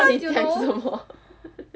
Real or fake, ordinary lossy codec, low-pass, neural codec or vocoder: real; none; none; none